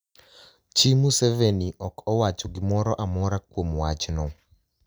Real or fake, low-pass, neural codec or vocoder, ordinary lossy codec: real; none; none; none